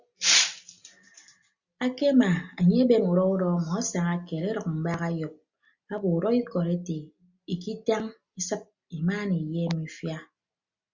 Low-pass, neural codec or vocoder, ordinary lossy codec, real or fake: 7.2 kHz; none; Opus, 64 kbps; real